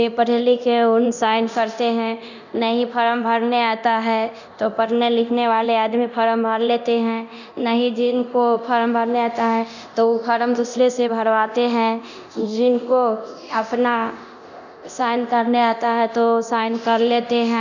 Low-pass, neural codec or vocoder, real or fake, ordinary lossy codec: 7.2 kHz; codec, 24 kHz, 0.9 kbps, DualCodec; fake; none